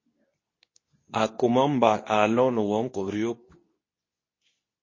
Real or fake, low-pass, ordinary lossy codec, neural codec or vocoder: fake; 7.2 kHz; MP3, 32 kbps; codec, 24 kHz, 0.9 kbps, WavTokenizer, medium speech release version 2